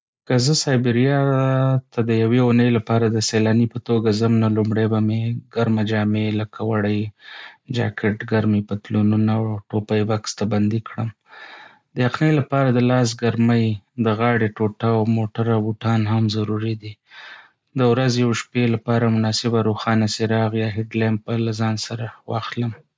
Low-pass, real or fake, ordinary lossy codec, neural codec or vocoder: none; real; none; none